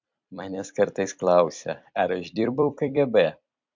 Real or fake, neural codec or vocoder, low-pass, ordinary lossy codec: fake; vocoder, 44.1 kHz, 128 mel bands every 256 samples, BigVGAN v2; 7.2 kHz; MP3, 64 kbps